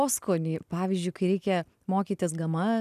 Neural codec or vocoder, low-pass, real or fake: none; 14.4 kHz; real